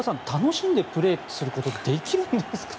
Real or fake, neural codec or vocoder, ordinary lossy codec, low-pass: real; none; none; none